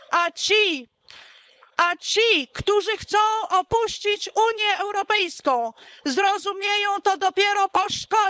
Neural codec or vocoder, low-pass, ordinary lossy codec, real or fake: codec, 16 kHz, 4.8 kbps, FACodec; none; none; fake